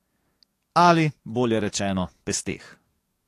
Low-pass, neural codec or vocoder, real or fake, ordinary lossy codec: 14.4 kHz; codec, 44.1 kHz, 7.8 kbps, DAC; fake; AAC, 48 kbps